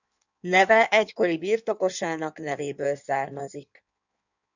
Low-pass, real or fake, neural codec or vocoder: 7.2 kHz; fake; codec, 16 kHz in and 24 kHz out, 1.1 kbps, FireRedTTS-2 codec